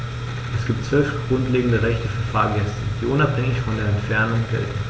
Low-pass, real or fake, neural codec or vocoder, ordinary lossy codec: none; real; none; none